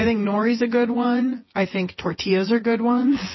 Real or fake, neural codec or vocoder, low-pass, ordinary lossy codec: fake; vocoder, 24 kHz, 100 mel bands, Vocos; 7.2 kHz; MP3, 24 kbps